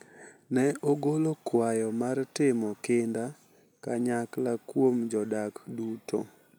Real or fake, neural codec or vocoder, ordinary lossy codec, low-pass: real; none; none; none